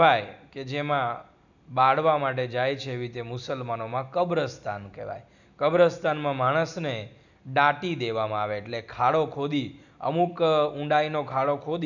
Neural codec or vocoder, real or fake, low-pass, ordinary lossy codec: none; real; 7.2 kHz; none